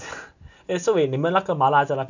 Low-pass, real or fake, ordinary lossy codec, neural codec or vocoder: 7.2 kHz; real; none; none